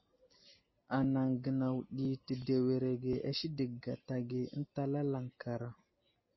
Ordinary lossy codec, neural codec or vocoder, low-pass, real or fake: MP3, 24 kbps; none; 7.2 kHz; real